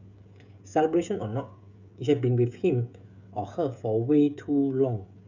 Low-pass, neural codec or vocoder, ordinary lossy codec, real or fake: 7.2 kHz; codec, 16 kHz, 16 kbps, FreqCodec, smaller model; none; fake